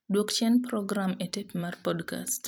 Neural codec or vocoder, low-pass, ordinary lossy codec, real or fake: vocoder, 44.1 kHz, 128 mel bands every 256 samples, BigVGAN v2; none; none; fake